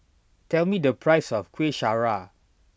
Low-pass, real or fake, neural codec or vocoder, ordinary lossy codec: none; real; none; none